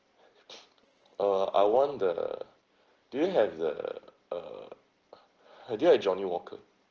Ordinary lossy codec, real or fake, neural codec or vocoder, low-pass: Opus, 16 kbps; real; none; 7.2 kHz